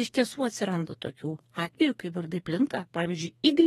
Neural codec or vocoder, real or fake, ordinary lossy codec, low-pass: codec, 32 kHz, 1.9 kbps, SNAC; fake; AAC, 32 kbps; 14.4 kHz